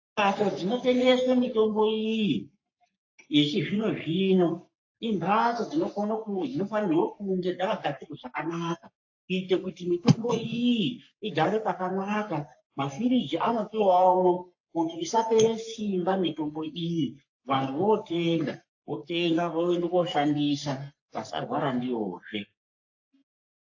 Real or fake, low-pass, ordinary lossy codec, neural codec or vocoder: fake; 7.2 kHz; AAC, 48 kbps; codec, 44.1 kHz, 3.4 kbps, Pupu-Codec